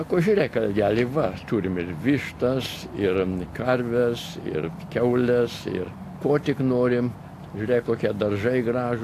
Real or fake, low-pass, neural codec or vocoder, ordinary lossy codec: real; 14.4 kHz; none; AAC, 64 kbps